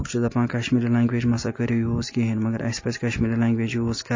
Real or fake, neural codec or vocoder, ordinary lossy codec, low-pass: real; none; MP3, 32 kbps; 7.2 kHz